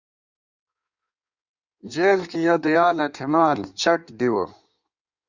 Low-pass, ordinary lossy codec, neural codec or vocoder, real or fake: 7.2 kHz; Opus, 64 kbps; codec, 16 kHz in and 24 kHz out, 1.1 kbps, FireRedTTS-2 codec; fake